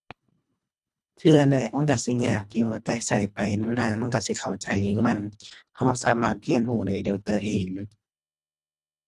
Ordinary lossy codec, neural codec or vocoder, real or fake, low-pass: none; codec, 24 kHz, 1.5 kbps, HILCodec; fake; none